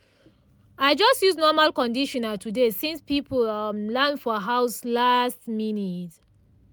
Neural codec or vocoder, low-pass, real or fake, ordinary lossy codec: none; none; real; none